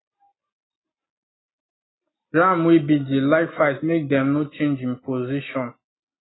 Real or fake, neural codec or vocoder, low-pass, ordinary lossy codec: real; none; 7.2 kHz; AAC, 16 kbps